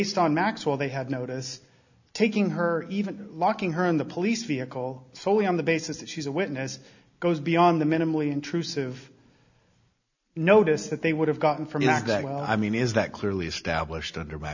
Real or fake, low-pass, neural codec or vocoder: real; 7.2 kHz; none